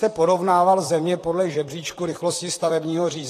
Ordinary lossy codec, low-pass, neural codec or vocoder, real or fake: AAC, 48 kbps; 14.4 kHz; vocoder, 44.1 kHz, 128 mel bands, Pupu-Vocoder; fake